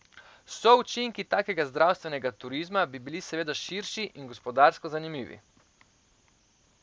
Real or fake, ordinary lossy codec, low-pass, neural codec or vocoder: real; none; none; none